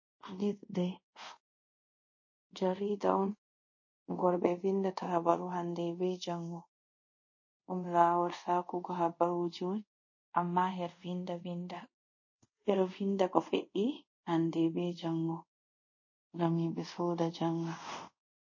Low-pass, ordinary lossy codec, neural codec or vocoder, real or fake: 7.2 kHz; MP3, 32 kbps; codec, 24 kHz, 0.5 kbps, DualCodec; fake